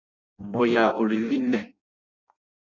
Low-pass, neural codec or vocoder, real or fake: 7.2 kHz; codec, 16 kHz in and 24 kHz out, 0.6 kbps, FireRedTTS-2 codec; fake